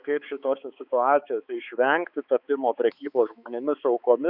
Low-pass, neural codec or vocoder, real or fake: 5.4 kHz; codec, 16 kHz, 4 kbps, X-Codec, HuBERT features, trained on balanced general audio; fake